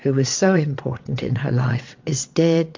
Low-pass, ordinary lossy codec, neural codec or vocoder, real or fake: 7.2 kHz; MP3, 48 kbps; vocoder, 22.05 kHz, 80 mel bands, WaveNeXt; fake